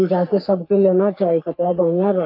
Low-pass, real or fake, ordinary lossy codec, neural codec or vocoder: 5.4 kHz; fake; AAC, 48 kbps; codec, 44.1 kHz, 3.4 kbps, Pupu-Codec